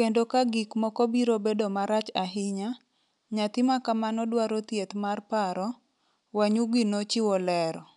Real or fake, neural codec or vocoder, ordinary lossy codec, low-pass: real; none; none; 10.8 kHz